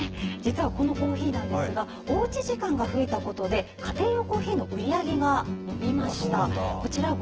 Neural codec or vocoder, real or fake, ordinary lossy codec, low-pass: vocoder, 24 kHz, 100 mel bands, Vocos; fake; Opus, 16 kbps; 7.2 kHz